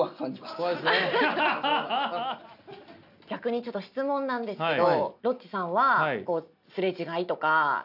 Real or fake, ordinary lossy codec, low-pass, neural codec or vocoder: real; none; 5.4 kHz; none